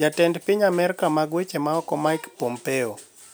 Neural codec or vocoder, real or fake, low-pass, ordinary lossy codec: none; real; none; none